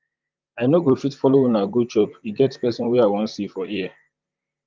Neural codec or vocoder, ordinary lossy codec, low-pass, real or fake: vocoder, 44.1 kHz, 128 mel bands, Pupu-Vocoder; Opus, 24 kbps; 7.2 kHz; fake